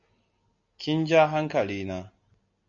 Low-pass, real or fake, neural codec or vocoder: 7.2 kHz; real; none